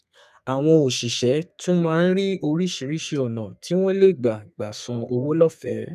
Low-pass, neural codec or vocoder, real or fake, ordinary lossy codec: 14.4 kHz; codec, 32 kHz, 1.9 kbps, SNAC; fake; none